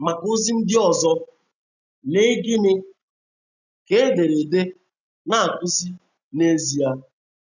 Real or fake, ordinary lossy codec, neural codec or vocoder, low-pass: real; none; none; 7.2 kHz